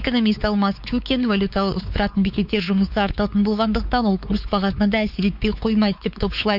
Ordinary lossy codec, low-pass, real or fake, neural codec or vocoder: none; 5.4 kHz; fake; codec, 16 kHz, 4 kbps, X-Codec, WavLM features, trained on Multilingual LibriSpeech